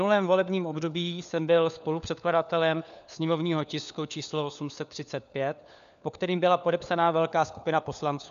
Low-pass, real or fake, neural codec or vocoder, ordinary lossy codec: 7.2 kHz; fake; codec, 16 kHz, 4 kbps, FunCodec, trained on LibriTTS, 50 frames a second; MP3, 96 kbps